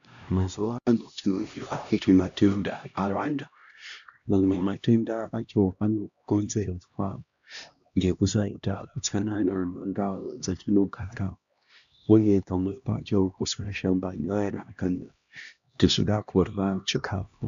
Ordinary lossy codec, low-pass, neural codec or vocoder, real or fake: AAC, 96 kbps; 7.2 kHz; codec, 16 kHz, 1 kbps, X-Codec, HuBERT features, trained on LibriSpeech; fake